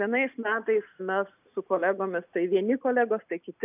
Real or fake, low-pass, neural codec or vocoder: real; 3.6 kHz; none